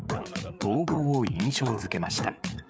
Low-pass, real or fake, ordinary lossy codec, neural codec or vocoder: none; fake; none; codec, 16 kHz, 8 kbps, FreqCodec, larger model